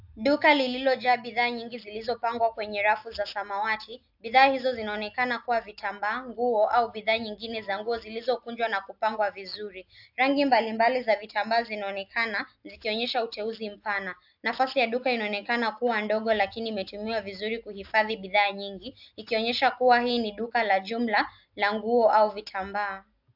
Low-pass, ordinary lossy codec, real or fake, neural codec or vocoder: 5.4 kHz; AAC, 48 kbps; real; none